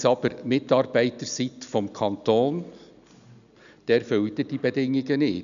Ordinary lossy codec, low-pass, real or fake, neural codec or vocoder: none; 7.2 kHz; real; none